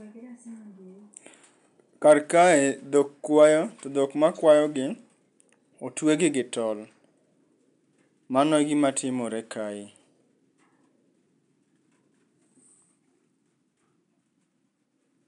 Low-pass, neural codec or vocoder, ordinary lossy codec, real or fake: 10.8 kHz; none; none; real